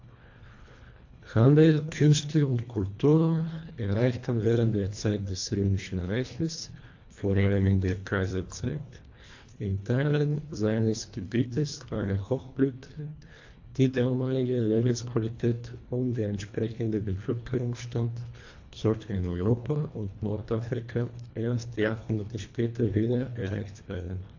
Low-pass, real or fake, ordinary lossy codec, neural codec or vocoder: 7.2 kHz; fake; AAC, 48 kbps; codec, 24 kHz, 1.5 kbps, HILCodec